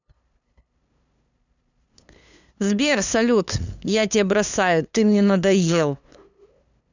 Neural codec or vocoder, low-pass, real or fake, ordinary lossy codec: codec, 16 kHz, 2 kbps, FunCodec, trained on LibriTTS, 25 frames a second; 7.2 kHz; fake; none